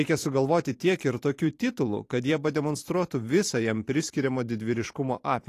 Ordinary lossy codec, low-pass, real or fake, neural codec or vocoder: AAC, 48 kbps; 14.4 kHz; fake; vocoder, 44.1 kHz, 128 mel bands every 512 samples, BigVGAN v2